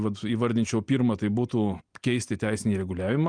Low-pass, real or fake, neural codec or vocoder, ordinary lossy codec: 9.9 kHz; real; none; Opus, 32 kbps